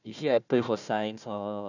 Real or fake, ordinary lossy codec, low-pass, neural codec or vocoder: fake; none; 7.2 kHz; codec, 16 kHz, 1 kbps, FunCodec, trained on Chinese and English, 50 frames a second